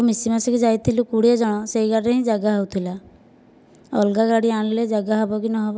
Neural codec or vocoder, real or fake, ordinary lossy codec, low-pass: none; real; none; none